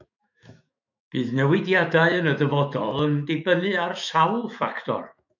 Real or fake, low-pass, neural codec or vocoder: fake; 7.2 kHz; autoencoder, 48 kHz, 128 numbers a frame, DAC-VAE, trained on Japanese speech